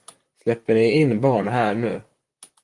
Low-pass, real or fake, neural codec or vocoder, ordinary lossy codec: 10.8 kHz; fake; vocoder, 44.1 kHz, 128 mel bands, Pupu-Vocoder; Opus, 32 kbps